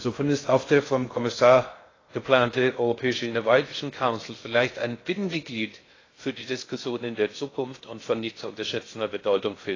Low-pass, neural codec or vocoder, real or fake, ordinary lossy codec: 7.2 kHz; codec, 16 kHz in and 24 kHz out, 0.6 kbps, FocalCodec, streaming, 2048 codes; fake; AAC, 32 kbps